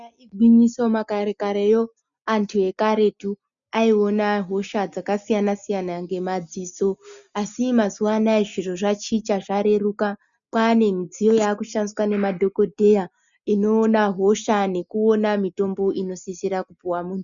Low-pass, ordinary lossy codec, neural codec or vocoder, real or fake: 7.2 kHz; MP3, 96 kbps; none; real